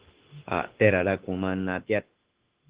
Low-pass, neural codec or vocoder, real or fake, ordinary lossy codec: 3.6 kHz; autoencoder, 48 kHz, 32 numbers a frame, DAC-VAE, trained on Japanese speech; fake; Opus, 16 kbps